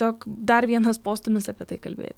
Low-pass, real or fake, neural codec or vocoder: 19.8 kHz; fake; codec, 44.1 kHz, 7.8 kbps, DAC